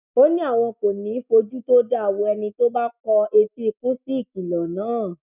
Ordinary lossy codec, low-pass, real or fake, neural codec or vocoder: none; 3.6 kHz; real; none